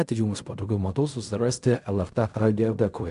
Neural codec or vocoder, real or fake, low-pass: codec, 16 kHz in and 24 kHz out, 0.4 kbps, LongCat-Audio-Codec, fine tuned four codebook decoder; fake; 10.8 kHz